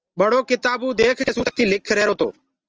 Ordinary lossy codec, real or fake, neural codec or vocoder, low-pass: Opus, 24 kbps; real; none; 7.2 kHz